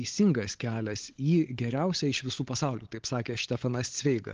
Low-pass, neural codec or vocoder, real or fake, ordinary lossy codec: 7.2 kHz; codec, 16 kHz, 16 kbps, FunCodec, trained on LibriTTS, 50 frames a second; fake; Opus, 16 kbps